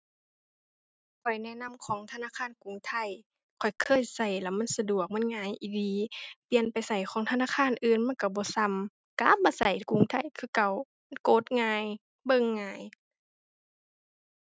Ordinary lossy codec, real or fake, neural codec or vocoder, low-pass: none; real; none; none